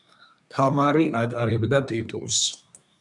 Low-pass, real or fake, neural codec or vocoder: 10.8 kHz; fake; codec, 24 kHz, 1 kbps, SNAC